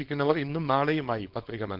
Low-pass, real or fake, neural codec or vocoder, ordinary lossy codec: 5.4 kHz; fake; codec, 24 kHz, 0.9 kbps, WavTokenizer, small release; Opus, 16 kbps